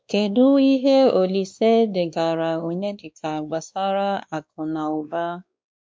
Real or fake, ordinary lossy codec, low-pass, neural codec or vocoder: fake; none; none; codec, 16 kHz, 2 kbps, X-Codec, WavLM features, trained on Multilingual LibriSpeech